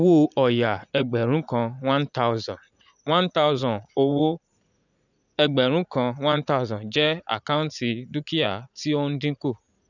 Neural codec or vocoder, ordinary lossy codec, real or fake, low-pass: vocoder, 44.1 kHz, 80 mel bands, Vocos; none; fake; 7.2 kHz